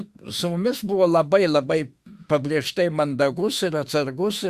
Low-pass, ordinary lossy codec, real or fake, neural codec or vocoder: 14.4 kHz; Opus, 64 kbps; fake; autoencoder, 48 kHz, 32 numbers a frame, DAC-VAE, trained on Japanese speech